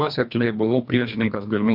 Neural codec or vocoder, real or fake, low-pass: codec, 24 kHz, 1.5 kbps, HILCodec; fake; 5.4 kHz